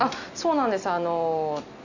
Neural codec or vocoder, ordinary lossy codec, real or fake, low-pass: none; none; real; 7.2 kHz